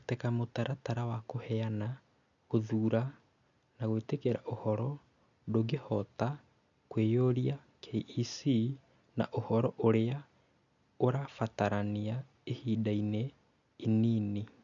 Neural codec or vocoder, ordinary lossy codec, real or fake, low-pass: none; none; real; 7.2 kHz